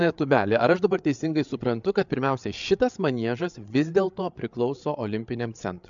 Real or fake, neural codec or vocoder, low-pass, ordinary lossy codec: fake; codec, 16 kHz, 8 kbps, FreqCodec, larger model; 7.2 kHz; MP3, 64 kbps